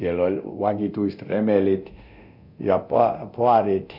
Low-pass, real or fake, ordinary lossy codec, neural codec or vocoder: 5.4 kHz; fake; none; codec, 24 kHz, 0.9 kbps, DualCodec